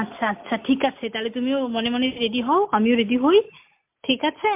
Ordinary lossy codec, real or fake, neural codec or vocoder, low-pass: MP3, 32 kbps; real; none; 3.6 kHz